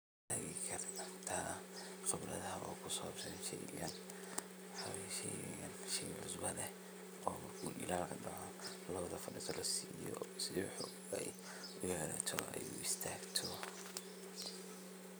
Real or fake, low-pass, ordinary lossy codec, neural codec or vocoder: real; none; none; none